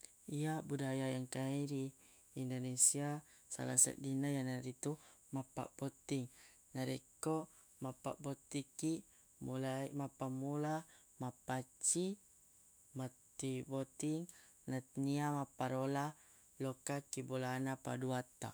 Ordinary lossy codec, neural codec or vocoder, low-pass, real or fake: none; autoencoder, 48 kHz, 128 numbers a frame, DAC-VAE, trained on Japanese speech; none; fake